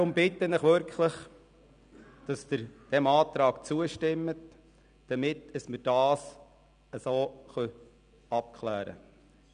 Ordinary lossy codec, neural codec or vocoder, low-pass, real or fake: none; none; 9.9 kHz; real